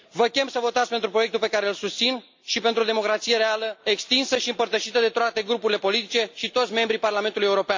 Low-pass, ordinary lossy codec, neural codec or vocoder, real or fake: 7.2 kHz; MP3, 48 kbps; none; real